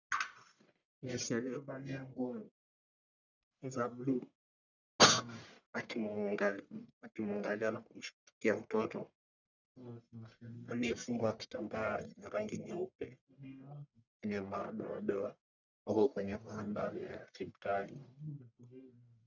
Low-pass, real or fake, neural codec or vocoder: 7.2 kHz; fake; codec, 44.1 kHz, 1.7 kbps, Pupu-Codec